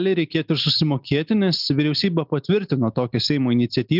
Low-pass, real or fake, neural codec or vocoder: 5.4 kHz; real; none